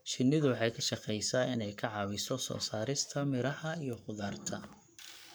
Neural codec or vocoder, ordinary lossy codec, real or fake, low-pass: codec, 44.1 kHz, 7.8 kbps, Pupu-Codec; none; fake; none